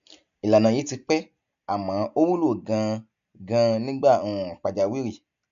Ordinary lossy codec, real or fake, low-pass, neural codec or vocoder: none; real; 7.2 kHz; none